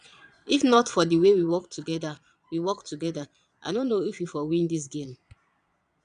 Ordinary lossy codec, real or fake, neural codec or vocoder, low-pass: none; fake; vocoder, 22.05 kHz, 80 mel bands, Vocos; 9.9 kHz